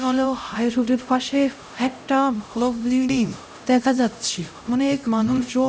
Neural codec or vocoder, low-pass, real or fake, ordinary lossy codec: codec, 16 kHz, 0.5 kbps, X-Codec, HuBERT features, trained on LibriSpeech; none; fake; none